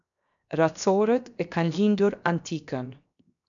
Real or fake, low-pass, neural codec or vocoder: fake; 7.2 kHz; codec, 16 kHz, 0.7 kbps, FocalCodec